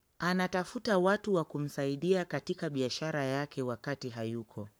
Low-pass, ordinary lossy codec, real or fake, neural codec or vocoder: none; none; fake; codec, 44.1 kHz, 7.8 kbps, Pupu-Codec